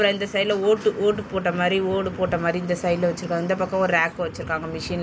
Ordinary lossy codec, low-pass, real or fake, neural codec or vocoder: none; none; real; none